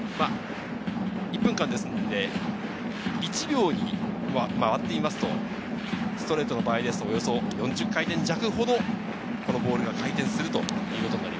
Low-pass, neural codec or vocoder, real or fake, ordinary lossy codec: none; none; real; none